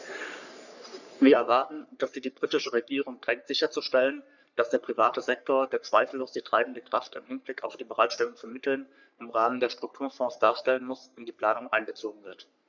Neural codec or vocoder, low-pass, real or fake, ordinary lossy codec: codec, 44.1 kHz, 3.4 kbps, Pupu-Codec; 7.2 kHz; fake; none